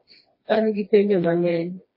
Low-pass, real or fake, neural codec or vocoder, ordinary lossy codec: 5.4 kHz; fake; codec, 16 kHz, 2 kbps, FreqCodec, smaller model; MP3, 24 kbps